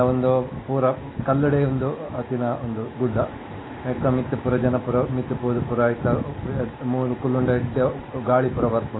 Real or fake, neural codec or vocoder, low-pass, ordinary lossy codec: real; none; 7.2 kHz; AAC, 16 kbps